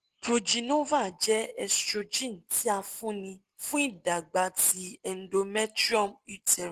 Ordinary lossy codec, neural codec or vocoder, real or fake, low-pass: Opus, 16 kbps; none; real; 14.4 kHz